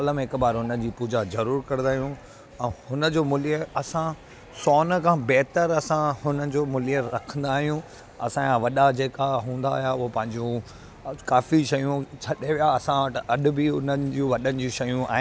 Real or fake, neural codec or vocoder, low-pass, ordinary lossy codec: real; none; none; none